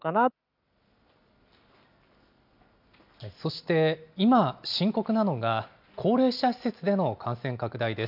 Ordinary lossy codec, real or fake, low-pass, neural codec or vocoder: none; real; 5.4 kHz; none